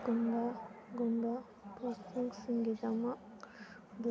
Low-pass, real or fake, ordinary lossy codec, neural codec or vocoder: none; real; none; none